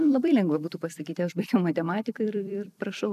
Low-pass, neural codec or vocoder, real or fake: 14.4 kHz; vocoder, 44.1 kHz, 128 mel bands every 256 samples, BigVGAN v2; fake